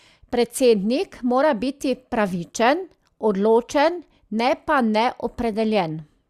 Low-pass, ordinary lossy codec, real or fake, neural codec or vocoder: 14.4 kHz; Opus, 64 kbps; real; none